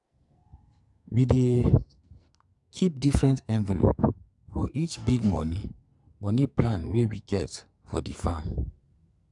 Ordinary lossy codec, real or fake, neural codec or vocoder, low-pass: none; fake; codec, 44.1 kHz, 2.6 kbps, SNAC; 10.8 kHz